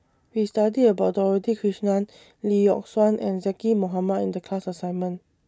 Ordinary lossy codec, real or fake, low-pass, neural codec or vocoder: none; real; none; none